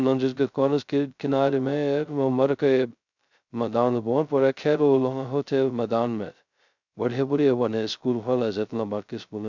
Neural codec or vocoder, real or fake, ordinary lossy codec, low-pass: codec, 16 kHz, 0.2 kbps, FocalCodec; fake; none; 7.2 kHz